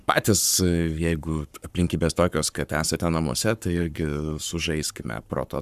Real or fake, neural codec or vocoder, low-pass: fake; codec, 44.1 kHz, 7.8 kbps, Pupu-Codec; 14.4 kHz